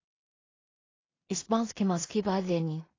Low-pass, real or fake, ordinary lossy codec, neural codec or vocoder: 7.2 kHz; fake; AAC, 32 kbps; codec, 16 kHz in and 24 kHz out, 0.4 kbps, LongCat-Audio-Codec, two codebook decoder